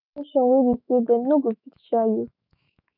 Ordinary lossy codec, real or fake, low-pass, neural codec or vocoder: none; fake; 5.4 kHz; autoencoder, 48 kHz, 128 numbers a frame, DAC-VAE, trained on Japanese speech